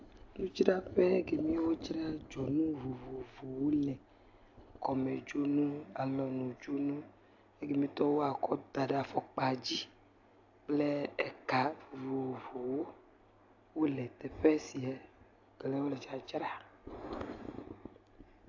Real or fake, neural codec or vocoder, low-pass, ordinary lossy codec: real; none; 7.2 kHz; AAC, 48 kbps